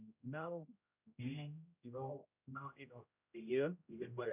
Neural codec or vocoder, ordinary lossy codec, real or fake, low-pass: codec, 16 kHz, 0.5 kbps, X-Codec, HuBERT features, trained on general audio; none; fake; 3.6 kHz